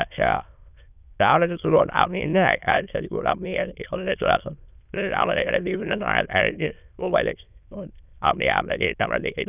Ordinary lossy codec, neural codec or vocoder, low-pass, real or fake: none; autoencoder, 22.05 kHz, a latent of 192 numbers a frame, VITS, trained on many speakers; 3.6 kHz; fake